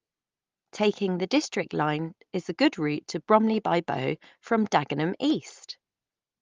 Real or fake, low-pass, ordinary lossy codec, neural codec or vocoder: real; 7.2 kHz; Opus, 32 kbps; none